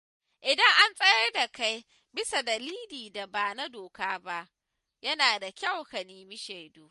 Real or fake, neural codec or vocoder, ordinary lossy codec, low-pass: real; none; MP3, 48 kbps; 14.4 kHz